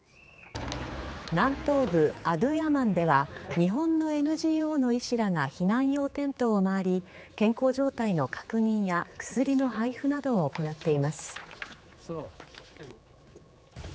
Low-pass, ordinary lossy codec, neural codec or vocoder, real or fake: none; none; codec, 16 kHz, 4 kbps, X-Codec, HuBERT features, trained on general audio; fake